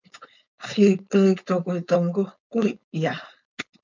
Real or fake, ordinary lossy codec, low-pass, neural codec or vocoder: fake; MP3, 64 kbps; 7.2 kHz; codec, 16 kHz, 4.8 kbps, FACodec